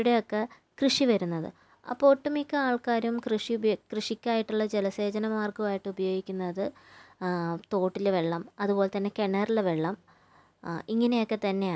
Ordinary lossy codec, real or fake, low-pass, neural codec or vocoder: none; real; none; none